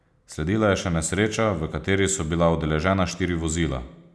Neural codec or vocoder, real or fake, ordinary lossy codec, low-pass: none; real; none; none